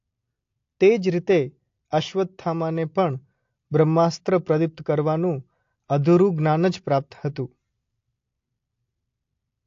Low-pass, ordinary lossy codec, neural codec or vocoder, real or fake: 7.2 kHz; AAC, 48 kbps; none; real